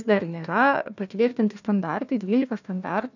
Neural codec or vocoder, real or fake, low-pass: codec, 16 kHz, 1 kbps, FunCodec, trained on Chinese and English, 50 frames a second; fake; 7.2 kHz